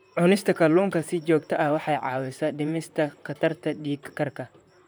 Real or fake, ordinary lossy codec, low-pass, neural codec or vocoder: fake; none; none; vocoder, 44.1 kHz, 128 mel bands, Pupu-Vocoder